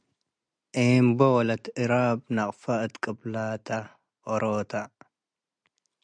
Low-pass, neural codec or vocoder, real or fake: 9.9 kHz; none; real